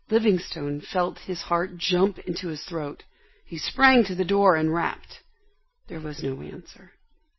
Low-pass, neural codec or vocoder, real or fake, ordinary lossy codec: 7.2 kHz; none; real; MP3, 24 kbps